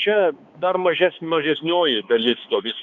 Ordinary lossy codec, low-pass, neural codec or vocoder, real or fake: Opus, 64 kbps; 7.2 kHz; codec, 16 kHz, 2 kbps, X-Codec, HuBERT features, trained on balanced general audio; fake